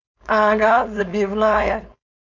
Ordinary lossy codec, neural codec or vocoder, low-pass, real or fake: none; codec, 16 kHz, 4.8 kbps, FACodec; 7.2 kHz; fake